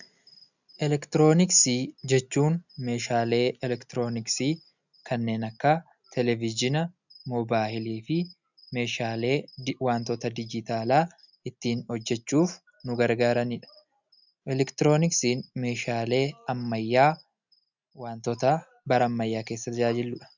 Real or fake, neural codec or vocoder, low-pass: real; none; 7.2 kHz